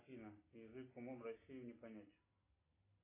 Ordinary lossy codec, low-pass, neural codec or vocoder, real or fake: AAC, 24 kbps; 3.6 kHz; none; real